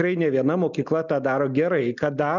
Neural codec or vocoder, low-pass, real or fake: none; 7.2 kHz; real